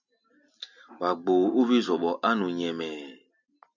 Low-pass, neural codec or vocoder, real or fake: 7.2 kHz; none; real